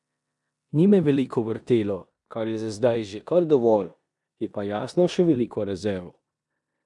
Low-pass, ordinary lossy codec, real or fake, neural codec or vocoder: 10.8 kHz; none; fake; codec, 16 kHz in and 24 kHz out, 0.9 kbps, LongCat-Audio-Codec, four codebook decoder